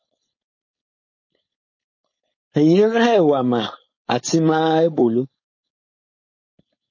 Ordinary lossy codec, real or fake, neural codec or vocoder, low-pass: MP3, 32 kbps; fake; codec, 16 kHz, 4.8 kbps, FACodec; 7.2 kHz